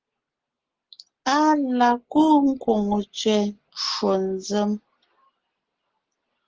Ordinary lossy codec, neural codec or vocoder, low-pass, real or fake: Opus, 16 kbps; none; 7.2 kHz; real